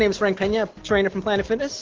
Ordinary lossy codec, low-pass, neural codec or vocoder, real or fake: Opus, 16 kbps; 7.2 kHz; none; real